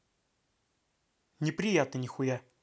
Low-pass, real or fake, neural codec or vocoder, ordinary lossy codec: none; real; none; none